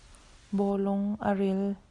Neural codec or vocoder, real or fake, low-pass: none; real; 10.8 kHz